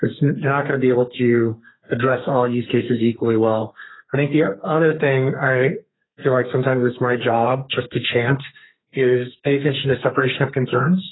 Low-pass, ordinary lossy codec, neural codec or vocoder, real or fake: 7.2 kHz; AAC, 16 kbps; codec, 32 kHz, 1.9 kbps, SNAC; fake